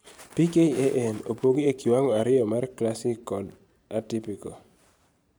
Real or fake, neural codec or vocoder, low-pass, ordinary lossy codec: fake; vocoder, 44.1 kHz, 128 mel bands every 512 samples, BigVGAN v2; none; none